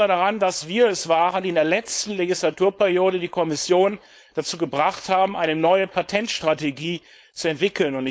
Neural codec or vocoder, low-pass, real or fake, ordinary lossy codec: codec, 16 kHz, 4.8 kbps, FACodec; none; fake; none